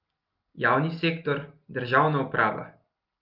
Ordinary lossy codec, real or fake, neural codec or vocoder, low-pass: Opus, 24 kbps; real; none; 5.4 kHz